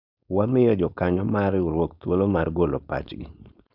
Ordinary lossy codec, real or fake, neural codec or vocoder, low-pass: none; fake; codec, 16 kHz, 4.8 kbps, FACodec; 5.4 kHz